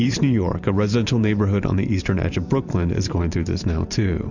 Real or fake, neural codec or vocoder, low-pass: fake; vocoder, 44.1 kHz, 128 mel bands every 512 samples, BigVGAN v2; 7.2 kHz